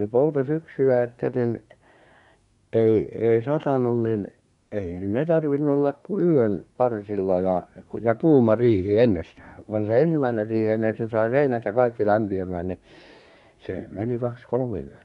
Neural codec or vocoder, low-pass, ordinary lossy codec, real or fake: codec, 24 kHz, 1 kbps, SNAC; 10.8 kHz; none; fake